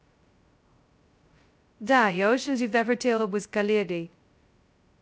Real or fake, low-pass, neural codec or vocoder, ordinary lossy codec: fake; none; codec, 16 kHz, 0.2 kbps, FocalCodec; none